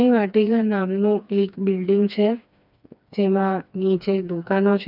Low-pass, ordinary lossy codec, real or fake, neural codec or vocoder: 5.4 kHz; none; fake; codec, 16 kHz, 2 kbps, FreqCodec, smaller model